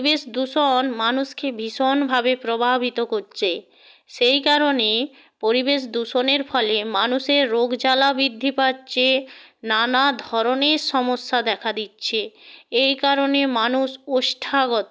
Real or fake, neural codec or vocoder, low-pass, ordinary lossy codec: real; none; none; none